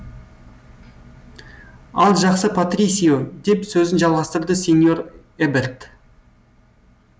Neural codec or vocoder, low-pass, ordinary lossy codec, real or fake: none; none; none; real